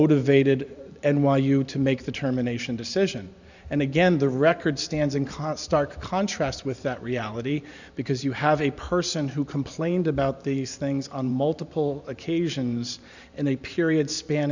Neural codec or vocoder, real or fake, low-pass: vocoder, 44.1 kHz, 128 mel bands every 512 samples, BigVGAN v2; fake; 7.2 kHz